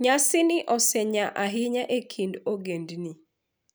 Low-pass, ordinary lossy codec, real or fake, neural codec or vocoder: none; none; real; none